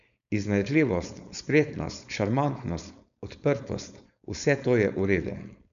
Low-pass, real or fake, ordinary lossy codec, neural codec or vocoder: 7.2 kHz; fake; none; codec, 16 kHz, 4.8 kbps, FACodec